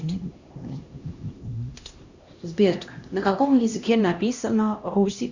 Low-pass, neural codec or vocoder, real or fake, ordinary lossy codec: 7.2 kHz; codec, 16 kHz, 1 kbps, X-Codec, HuBERT features, trained on LibriSpeech; fake; Opus, 64 kbps